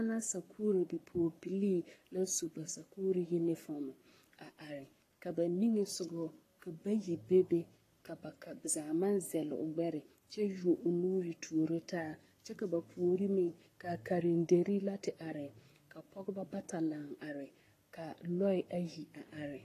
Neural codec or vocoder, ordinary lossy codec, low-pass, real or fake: codec, 44.1 kHz, 7.8 kbps, Pupu-Codec; AAC, 48 kbps; 14.4 kHz; fake